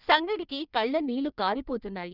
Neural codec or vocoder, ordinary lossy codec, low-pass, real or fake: codec, 16 kHz in and 24 kHz out, 1.1 kbps, FireRedTTS-2 codec; none; 5.4 kHz; fake